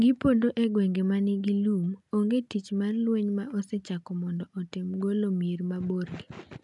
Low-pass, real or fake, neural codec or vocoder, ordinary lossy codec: 10.8 kHz; real; none; none